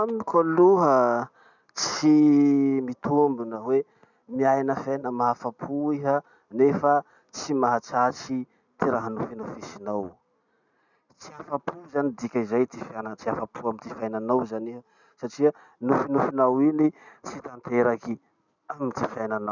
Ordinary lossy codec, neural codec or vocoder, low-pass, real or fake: none; none; 7.2 kHz; real